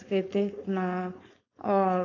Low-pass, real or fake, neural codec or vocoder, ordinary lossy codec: 7.2 kHz; fake; codec, 16 kHz, 4.8 kbps, FACodec; AAC, 32 kbps